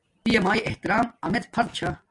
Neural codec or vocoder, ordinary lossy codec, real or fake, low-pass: none; MP3, 64 kbps; real; 10.8 kHz